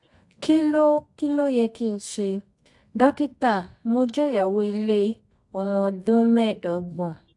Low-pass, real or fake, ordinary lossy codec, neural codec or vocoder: 10.8 kHz; fake; AAC, 64 kbps; codec, 24 kHz, 0.9 kbps, WavTokenizer, medium music audio release